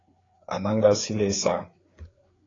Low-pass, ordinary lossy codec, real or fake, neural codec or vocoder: 7.2 kHz; AAC, 32 kbps; fake; codec, 16 kHz, 4 kbps, FreqCodec, larger model